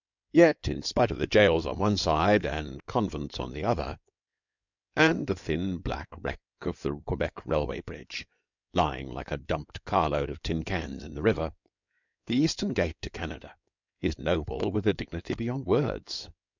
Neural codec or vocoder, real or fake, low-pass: codec, 16 kHz in and 24 kHz out, 2.2 kbps, FireRedTTS-2 codec; fake; 7.2 kHz